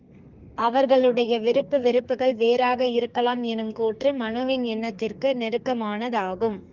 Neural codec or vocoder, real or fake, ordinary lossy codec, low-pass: codec, 32 kHz, 1.9 kbps, SNAC; fake; Opus, 24 kbps; 7.2 kHz